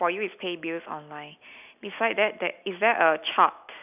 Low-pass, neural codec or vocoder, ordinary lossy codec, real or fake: 3.6 kHz; none; none; real